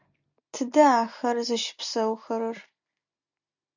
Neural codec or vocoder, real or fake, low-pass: none; real; 7.2 kHz